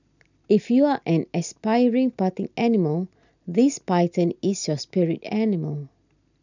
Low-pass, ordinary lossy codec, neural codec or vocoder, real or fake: 7.2 kHz; none; none; real